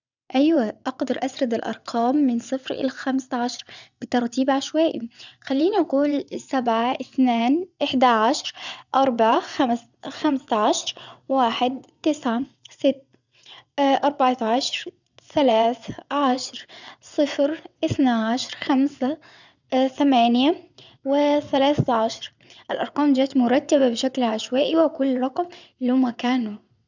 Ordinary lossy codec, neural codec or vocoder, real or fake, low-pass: none; vocoder, 22.05 kHz, 80 mel bands, WaveNeXt; fake; 7.2 kHz